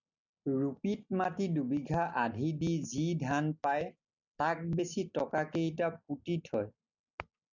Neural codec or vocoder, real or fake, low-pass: none; real; 7.2 kHz